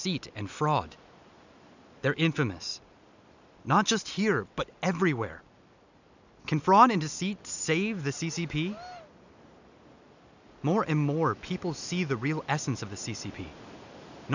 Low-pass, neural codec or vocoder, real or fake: 7.2 kHz; none; real